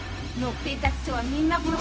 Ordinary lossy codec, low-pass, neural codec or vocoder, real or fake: none; none; codec, 16 kHz, 0.4 kbps, LongCat-Audio-Codec; fake